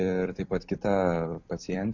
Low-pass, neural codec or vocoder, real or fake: 7.2 kHz; vocoder, 44.1 kHz, 128 mel bands every 256 samples, BigVGAN v2; fake